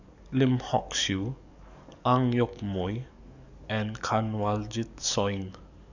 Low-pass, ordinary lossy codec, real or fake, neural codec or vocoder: 7.2 kHz; none; fake; codec, 44.1 kHz, 7.8 kbps, DAC